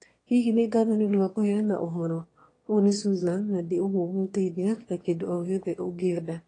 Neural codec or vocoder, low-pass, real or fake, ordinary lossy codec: autoencoder, 22.05 kHz, a latent of 192 numbers a frame, VITS, trained on one speaker; 9.9 kHz; fake; AAC, 32 kbps